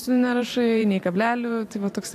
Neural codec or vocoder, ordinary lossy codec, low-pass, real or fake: vocoder, 44.1 kHz, 128 mel bands every 512 samples, BigVGAN v2; AAC, 64 kbps; 14.4 kHz; fake